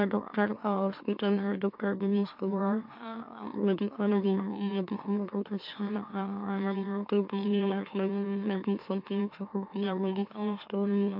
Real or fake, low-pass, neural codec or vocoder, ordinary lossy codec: fake; 5.4 kHz; autoencoder, 44.1 kHz, a latent of 192 numbers a frame, MeloTTS; MP3, 48 kbps